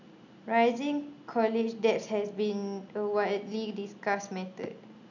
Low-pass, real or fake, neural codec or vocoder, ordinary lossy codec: 7.2 kHz; real; none; none